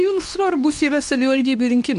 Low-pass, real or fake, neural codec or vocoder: 10.8 kHz; fake; codec, 24 kHz, 0.9 kbps, WavTokenizer, medium speech release version 2